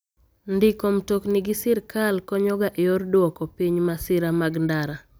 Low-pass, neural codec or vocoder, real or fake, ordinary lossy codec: none; none; real; none